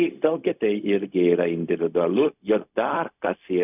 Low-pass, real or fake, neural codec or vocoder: 3.6 kHz; fake; codec, 16 kHz, 0.4 kbps, LongCat-Audio-Codec